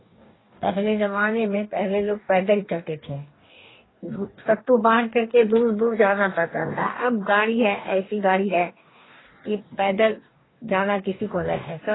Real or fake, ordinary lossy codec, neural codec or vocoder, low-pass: fake; AAC, 16 kbps; codec, 44.1 kHz, 2.6 kbps, DAC; 7.2 kHz